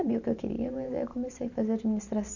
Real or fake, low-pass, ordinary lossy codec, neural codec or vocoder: fake; 7.2 kHz; none; vocoder, 44.1 kHz, 128 mel bands every 512 samples, BigVGAN v2